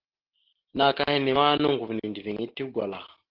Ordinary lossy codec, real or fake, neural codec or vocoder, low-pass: Opus, 16 kbps; real; none; 5.4 kHz